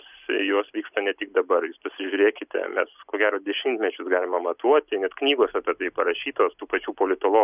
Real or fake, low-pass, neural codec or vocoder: real; 3.6 kHz; none